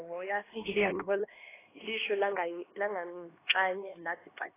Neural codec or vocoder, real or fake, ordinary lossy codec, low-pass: codec, 16 kHz, 2 kbps, X-Codec, HuBERT features, trained on LibriSpeech; fake; AAC, 16 kbps; 3.6 kHz